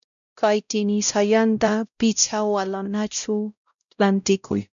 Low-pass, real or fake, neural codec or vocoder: 7.2 kHz; fake; codec, 16 kHz, 0.5 kbps, X-Codec, WavLM features, trained on Multilingual LibriSpeech